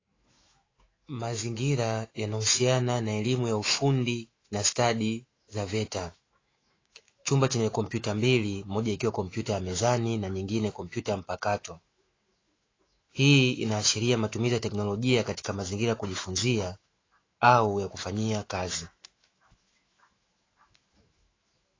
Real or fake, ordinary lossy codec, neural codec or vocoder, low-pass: fake; AAC, 32 kbps; autoencoder, 48 kHz, 128 numbers a frame, DAC-VAE, trained on Japanese speech; 7.2 kHz